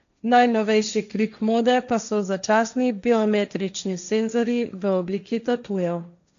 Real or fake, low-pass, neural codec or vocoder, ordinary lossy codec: fake; 7.2 kHz; codec, 16 kHz, 1.1 kbps, Voila-Tokenizer; none